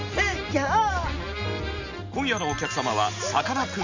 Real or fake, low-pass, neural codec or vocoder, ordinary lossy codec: real; 7.2 kHz; none; Opus, 64 kbps